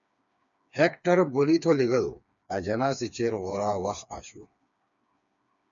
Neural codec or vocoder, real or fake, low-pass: codec, 16 kHz, 4 kbps, FreqCodec, smaller model; fake; 7.2 kHz